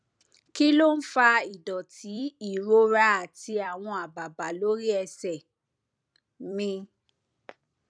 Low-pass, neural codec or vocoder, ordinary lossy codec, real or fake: 9.9 kHz; vocoder, 44.1 kHz, 128 mel bands every 256 samples, BigVGAN v2; none; fake